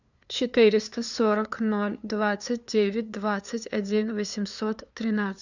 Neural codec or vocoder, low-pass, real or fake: codec, 16 kHz, 2 kbps, FunCodec, trained on LibriTTS, 25 frames a second; 7.2 kHz; fake